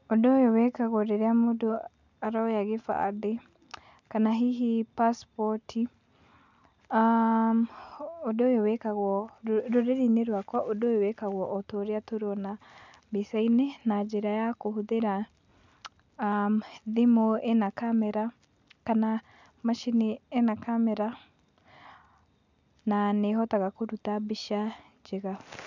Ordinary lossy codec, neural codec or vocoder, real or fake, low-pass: none; none; real; 7.2 kHz